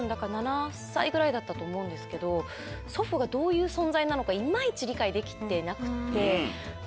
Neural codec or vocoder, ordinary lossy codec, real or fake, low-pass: none; none; real; none